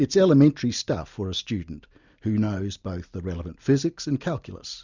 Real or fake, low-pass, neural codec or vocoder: real; 7.2 kHz; none